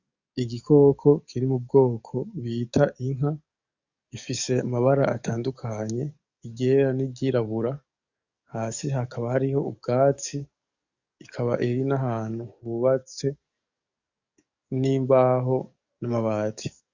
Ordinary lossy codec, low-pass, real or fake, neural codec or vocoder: Opus, 64 kbps; 7.2 kHz; fake; codec, 44.1 kHz, 7.8 kbps, DAC